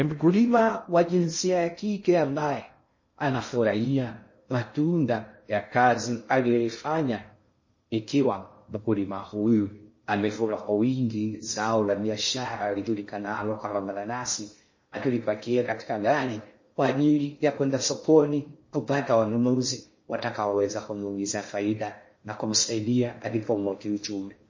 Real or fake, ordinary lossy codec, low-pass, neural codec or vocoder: fake; MP3, 32 kbps; 7.2 kHz; codec, 16 kHz in and 24 kHz out, 0.6 kbps, FocalCodec, streaming, 2048 codes